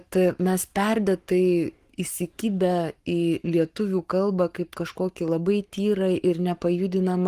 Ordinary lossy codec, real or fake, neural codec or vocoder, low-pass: Opus, 24 kbps; fake; codec, 44.1 kHz, 7.8 kbps, Pupu-Codec; 14.4 kHz